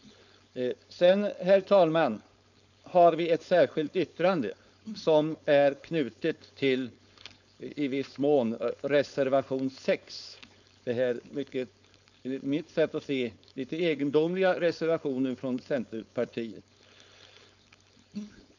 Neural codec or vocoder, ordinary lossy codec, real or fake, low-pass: codec, 16 kHz, 4.8 kbps, FACodec; none; fake; 7.2 kHz